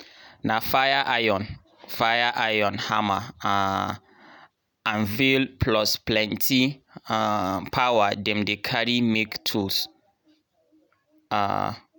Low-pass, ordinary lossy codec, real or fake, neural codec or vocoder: none; none; real; none